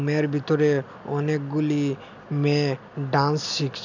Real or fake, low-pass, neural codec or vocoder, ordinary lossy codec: real; 7.2 kHz; none; none